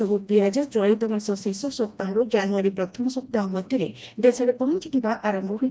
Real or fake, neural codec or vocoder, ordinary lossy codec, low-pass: fake; codec, 16 kHz, 1 kbps, FreqCodec, smaller model; none; none